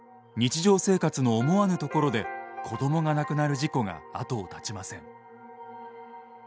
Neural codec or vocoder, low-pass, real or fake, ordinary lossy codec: none; none; real; none